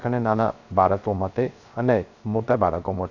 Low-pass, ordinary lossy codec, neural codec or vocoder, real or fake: 7.2 kHz; AAC, 48 kbps; codec, 16 kHz, 0.3 kbps, FocalCodec; fake